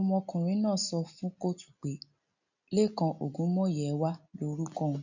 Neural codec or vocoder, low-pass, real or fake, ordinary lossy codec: none; 7.2 kHz; real; none